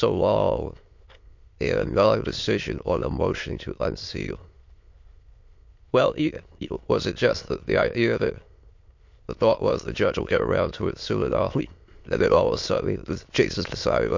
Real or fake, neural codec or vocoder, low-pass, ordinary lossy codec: fake; autoencoder, 22.05 kHz, a latent of 192 numbers a frame, VITS, trained on many speakers; 7.2 kHz; MP3, 48 kbps